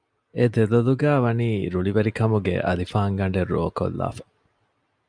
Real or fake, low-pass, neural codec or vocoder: real; 9.9 kHz; none